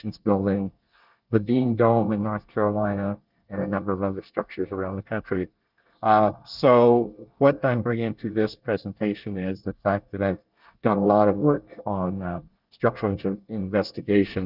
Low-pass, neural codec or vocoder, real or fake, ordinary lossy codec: 5.4 kHz; codec, 24 kHz, 1 kbps, SNAC; fake; Opus, 32 kbps